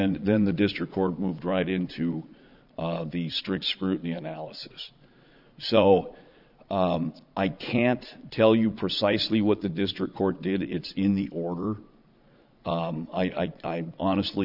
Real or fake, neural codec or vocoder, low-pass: fake; vocoder, 22.05 kHz, 80 mel bands, Vocos; 5.4 kHz